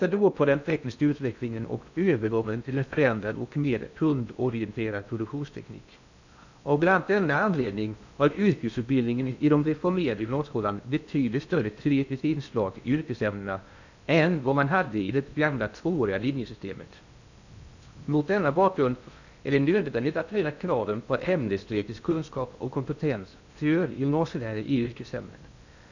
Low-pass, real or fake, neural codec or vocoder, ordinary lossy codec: 7.2 kHz; fake; codec, 16 kHz in and 24 kHz out, 0.6 kbps, FocalCodec, streaming, 2048 codes; none